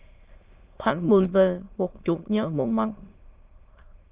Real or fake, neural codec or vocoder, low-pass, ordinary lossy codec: fake; autoencoder, 22.05 kHz, a latent of 192 numbers a frame, VITS, trained on many speakers; 3.6 kHz; Opus, 32 kbps